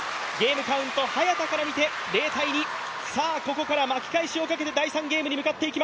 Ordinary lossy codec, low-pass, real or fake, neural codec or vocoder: none; none; real; none